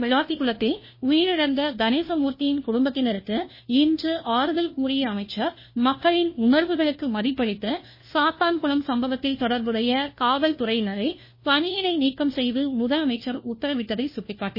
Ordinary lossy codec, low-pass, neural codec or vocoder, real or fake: MP3, 24 kbps; 5.4 kHz; codec, 16 kHz, 1 kbps, FunCodec, trained on LibriTTS, 50 frames a second; fake